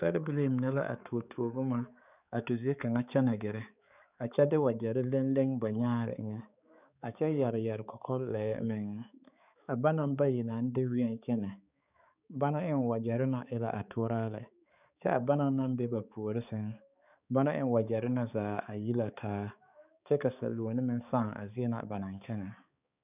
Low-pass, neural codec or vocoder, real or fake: 3.6 kHz; codec, 16 kHz, 4 kbps, X-Codec, HuBERT features, trained on balanced general audio; fake